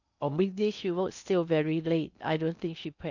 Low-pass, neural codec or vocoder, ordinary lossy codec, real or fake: 7.2 kHz; codec, 16 kHz in and 24 kHz out, 0.6 kbps, FocalCodec, streaming, 2048 codes; none; fake